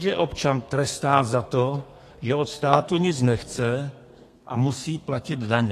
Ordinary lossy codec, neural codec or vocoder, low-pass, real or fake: AAC, 48 kbps; codec, 32 kHz, 1.9 kbps, SNAC; 14.4 kHz; fake